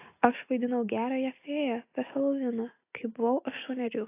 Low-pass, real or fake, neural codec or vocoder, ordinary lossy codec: 3.6 kHz; real; none; AAC, 24 kbps